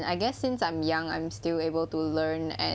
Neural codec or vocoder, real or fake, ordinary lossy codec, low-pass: none; real; none; none